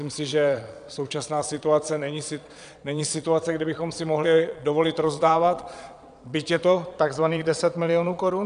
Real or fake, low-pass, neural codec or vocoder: fake; 9.9 kHz; vocoder, 22.05 kHz, 80 mel bands, Vocos